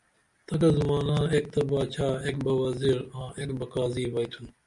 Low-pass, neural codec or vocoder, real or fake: 10.8 kHz; none; real